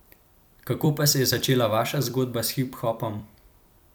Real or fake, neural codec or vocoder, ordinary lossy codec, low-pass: fake; vocoder, 44.1 kHz, 128 mel bands every 256 samples, BigVGAN v2; none; none